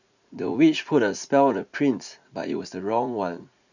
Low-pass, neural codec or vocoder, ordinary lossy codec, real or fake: 7.2 kHz; vocoder, 44.1 kHz, 80 mel bands, Vocos; none; fake